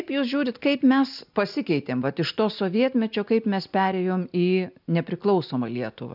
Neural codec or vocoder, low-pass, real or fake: none; 5.4 kHz; real